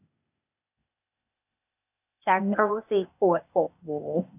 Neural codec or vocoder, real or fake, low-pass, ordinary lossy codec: codec, 16 kHz, 0.8 kbps, ZipCodec; fake; 3.6 kHz; AAC, 32 kbps